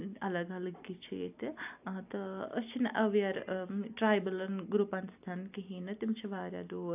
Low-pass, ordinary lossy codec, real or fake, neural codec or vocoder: 3.6 kHz; AAC, 32 kbps; fake; vocoder, 44.1 kHz, 128 mel bands every 256 samples, BigVGAN v2